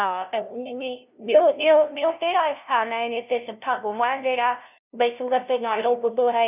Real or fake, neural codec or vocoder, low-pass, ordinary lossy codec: fake; codec, 16 kHz, 0.5 kbps, FunCodec, trained on LibriTTS, 25 frames a second; 3.6 kHz; none